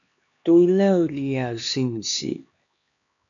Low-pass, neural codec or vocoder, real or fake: 7.2 kHz; codec, 16 kHz, 2 kbps, X-Codec, HuBERT features, trained on LibriSpeech; fake